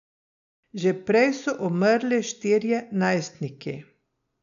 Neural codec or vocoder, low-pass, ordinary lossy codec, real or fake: none; 7.2 kHz; none; real